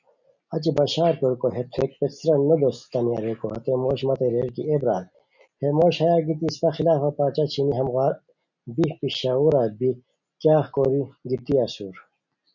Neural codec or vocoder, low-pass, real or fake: none; 7.2 kHz; real